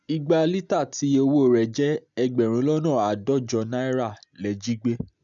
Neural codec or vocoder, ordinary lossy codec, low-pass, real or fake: none; none; 7.2 kHz; real